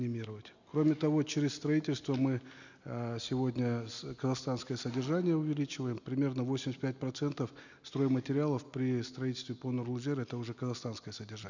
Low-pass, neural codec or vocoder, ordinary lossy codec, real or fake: 7.2 kHz; none; none; real